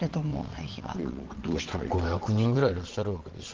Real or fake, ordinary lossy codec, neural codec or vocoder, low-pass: fake; Opus, 16 kbps; vocoder, 22.05 kHz, 80 mel bands, WaveNeXt; 7.2 kHz